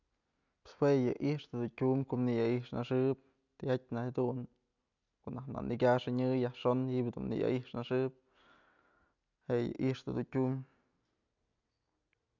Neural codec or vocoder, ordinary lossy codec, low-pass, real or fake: none; none; 7.2 kHz; real